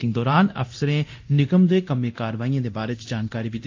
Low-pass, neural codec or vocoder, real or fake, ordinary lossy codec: 7.2 kHz; codec, 24 kHz, 0.9 kbps, DualCodec; fake; AAC, 48 kbps